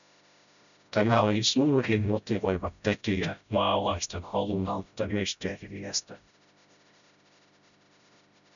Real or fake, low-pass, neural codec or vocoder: fake; 7.2 kHz; codec, 16 kHz, 0.5 kbps, FreqCodec, smaller model